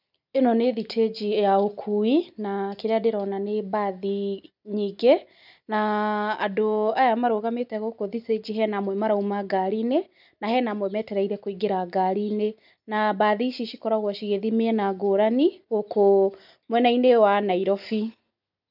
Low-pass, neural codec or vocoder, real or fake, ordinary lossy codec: 5.4 kHz; none; real; none